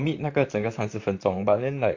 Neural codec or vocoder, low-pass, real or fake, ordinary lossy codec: vocoder, 44.1 kHz, 128 mel bands, Pupu-Vocoder; 7.2 kHz; fake; AAC, 48 kbps